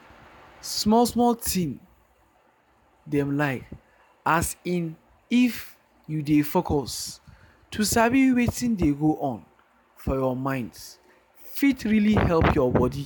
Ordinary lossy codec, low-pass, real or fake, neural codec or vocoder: none; none; real; none